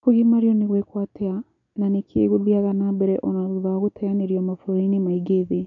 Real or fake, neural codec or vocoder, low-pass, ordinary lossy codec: real; none; 7.2 kHz; none